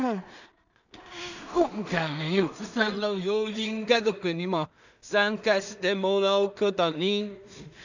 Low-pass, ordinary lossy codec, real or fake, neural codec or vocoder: 7.2 kHz; none; fake; codec, 16 kHz in and 24 kHz out, 0.4 kbps, LongCat-Audio-Codec, two codebook decoder